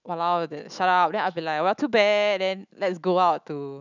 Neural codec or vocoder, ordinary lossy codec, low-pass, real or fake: autoencoder, 48 kHz, 128 numbers a frame, DAC-VAE, trained on Japanese speech; none; 7.2 kHz; fake